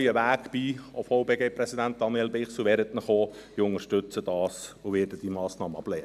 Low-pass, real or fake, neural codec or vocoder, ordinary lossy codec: 14.4 kHz; real; none; none